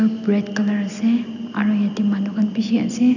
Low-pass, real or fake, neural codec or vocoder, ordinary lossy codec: 7.2 kHz; real; none; none